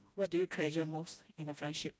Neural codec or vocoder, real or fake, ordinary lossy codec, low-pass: codec, 16 kHz, 1 kbps, FreqCodec, smaller model; fake; none; none